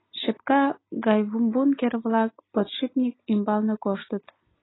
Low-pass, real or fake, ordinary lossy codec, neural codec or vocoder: 7.2 kHz; real; AAC, 16 kbps; none